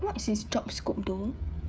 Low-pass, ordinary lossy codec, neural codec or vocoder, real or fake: none; none; codec, 16 kHz, 16 kbps, FreqCodec, smaller model; fake